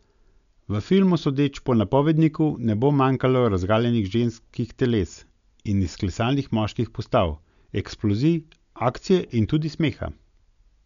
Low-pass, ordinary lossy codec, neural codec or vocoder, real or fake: 7.2 kHz; none; none; real